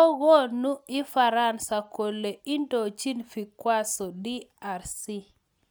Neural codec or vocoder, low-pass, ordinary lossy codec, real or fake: none; none; none; real